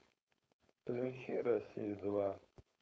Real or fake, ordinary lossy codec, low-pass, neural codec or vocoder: fake; none; none; codec, 16 kHz, 4.8 kbps, FACodec